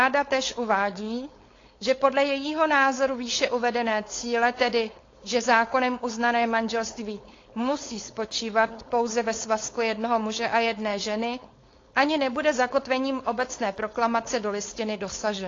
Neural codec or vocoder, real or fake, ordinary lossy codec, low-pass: codec, 16 kHz, 4.8 kbps, FACodec; fake; AAC, 32 kbps; 7.2 kHz